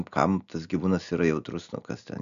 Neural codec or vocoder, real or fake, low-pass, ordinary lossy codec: none; real; 7.2 kHz; MP3, 96 kbps